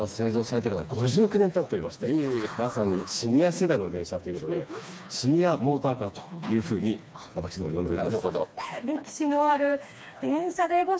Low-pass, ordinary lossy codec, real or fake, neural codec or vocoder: none; none; fake; codec, 16 kHz, 2 kbps, FreqCodec, smaller model